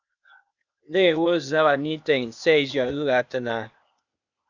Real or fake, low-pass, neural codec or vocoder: fake; 7.2 kHz; codec, 16 kHz, 0.8 kbps, ZipCodec